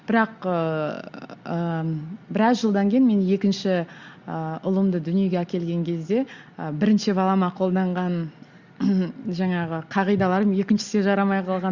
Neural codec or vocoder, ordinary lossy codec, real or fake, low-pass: none; Opus, 64 kbps; real; 7.2 kHz